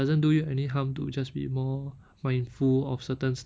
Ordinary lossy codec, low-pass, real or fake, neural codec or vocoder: none; none; real; none